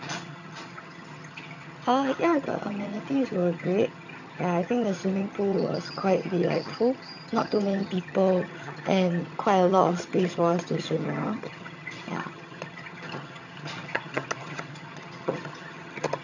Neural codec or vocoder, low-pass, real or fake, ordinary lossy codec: vocoder, 22.05 kHz, 80 mel bands, HiFi-GAN; 7.2 kHz; fake; none